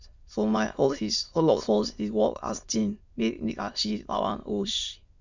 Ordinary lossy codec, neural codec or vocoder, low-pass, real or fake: Opus, 64 kbps; autoencoder, 22.05 kHz, a latent of 192 numbers a frame, VITS, trained on many speakers; 7.2 kHz; fake